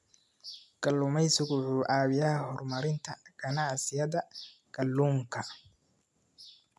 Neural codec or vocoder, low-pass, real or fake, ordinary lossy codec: none; none; real; none